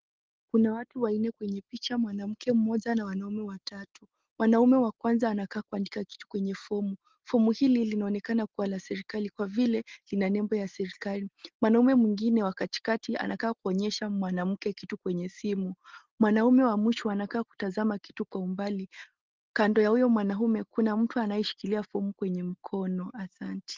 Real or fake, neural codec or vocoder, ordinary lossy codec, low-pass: real; none; Opus, 16 kbps; 7.2 kHz